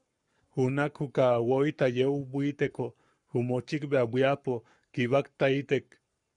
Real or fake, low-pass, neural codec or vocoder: fake; 10.8 kHz; codec, 44.1 kHz, 7.8 kbps, Pupu-Codec